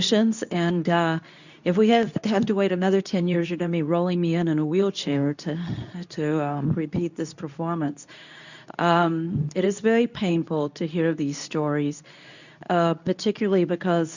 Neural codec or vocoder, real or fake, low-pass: codec, 24 kHz, 0.9 kbps, WavTokenizer, medium speech release version 2; fake; 7.2 kHz